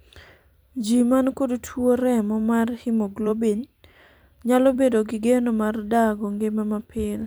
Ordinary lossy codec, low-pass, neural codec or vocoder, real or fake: none; none; vocoder, 44.1 kHz, 128 mel bands every 256 samples, BigVGAN v2; fake